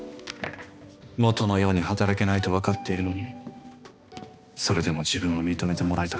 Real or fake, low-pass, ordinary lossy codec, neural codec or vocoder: fake; none; none; codec, 16 kHz, 2 kbps, X-Codec, HuBERT features, trained on balanced general audio